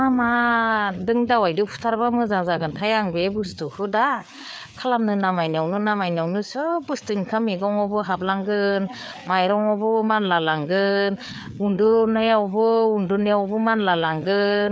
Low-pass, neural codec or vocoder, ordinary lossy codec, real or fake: none; codec, 16 kHz, 4 kbps, FreqCodec, larger model; none; fake